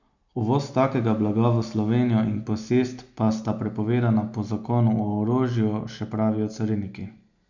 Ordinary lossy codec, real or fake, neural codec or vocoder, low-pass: none; real; none; 7.2 kHz